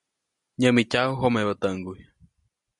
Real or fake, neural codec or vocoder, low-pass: real; none; 10.8 kHz